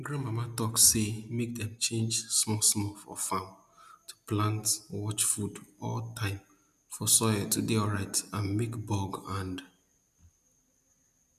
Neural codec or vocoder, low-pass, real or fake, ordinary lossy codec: none; 14.4 kHz; real; none